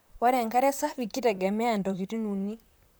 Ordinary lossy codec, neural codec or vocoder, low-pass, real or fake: none; vocoder, 44.1 kHz, 128 mel bands, Pupu-Vocoder; none; fake